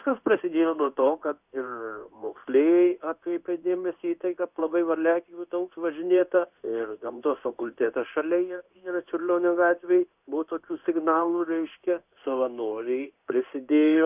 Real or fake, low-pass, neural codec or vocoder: fake; 3.6 kHz; codec, 16 kHz in and 24 kHz out, 1 kbps, XY-Tokenizer